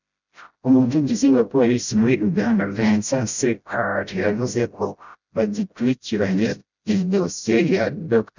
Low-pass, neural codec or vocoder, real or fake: 7.2 kHz; codec, 16 kHz, 0.5 kbps, FreqCodec, smaller model; fake